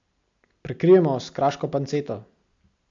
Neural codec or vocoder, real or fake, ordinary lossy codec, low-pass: none; real; AAC, 64 kbps; 7.2 kHz